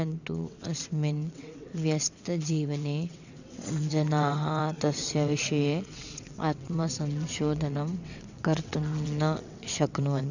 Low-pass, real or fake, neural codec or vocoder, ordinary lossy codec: 7.2 kHz; fake; vocoder, 22.05 kHz, 80 mel bands, WaveNeXt; none